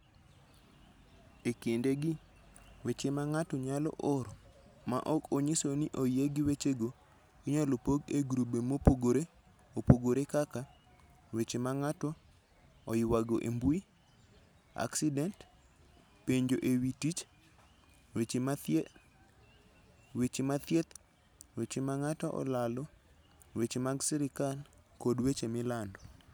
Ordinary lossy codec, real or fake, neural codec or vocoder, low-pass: none; real; none; none